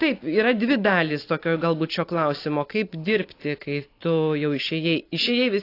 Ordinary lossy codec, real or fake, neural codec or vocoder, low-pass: AAC, 32 kbps; real; none; 5.4 kHz